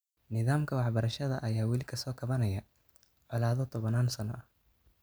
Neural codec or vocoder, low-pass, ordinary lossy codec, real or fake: none; none; none; real